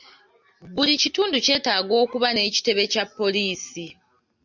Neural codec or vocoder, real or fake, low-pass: none; real; 7.2 kHz